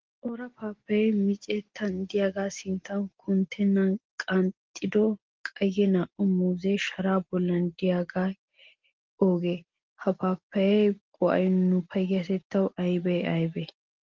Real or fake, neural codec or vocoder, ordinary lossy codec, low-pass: real; none; Opus, 16 kbps; 7.2 kHz